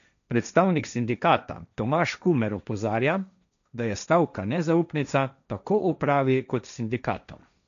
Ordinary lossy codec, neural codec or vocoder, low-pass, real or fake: none; codec, 16 kHz, 1.1 kbps, Voila-Tokenizer; 7.2 kHz; fake